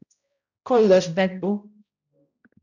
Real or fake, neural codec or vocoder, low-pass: fake; codec, 16 kHz, 0.5 kbps, X-Codec, HuBERT features, trained on balanced general audio; 7.2 kHz